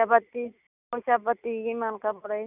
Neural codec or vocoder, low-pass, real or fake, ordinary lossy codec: none; 3.6 kHz; real; none